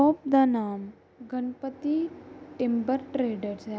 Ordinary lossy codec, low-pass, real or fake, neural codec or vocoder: none; none; real; none